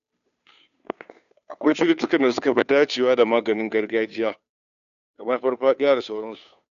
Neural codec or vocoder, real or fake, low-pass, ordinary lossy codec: codec, 16 kHz, 2 kbps, FunCodec, trained on Chinese and English, 25 frames a second; fake; 7.2 kHz; none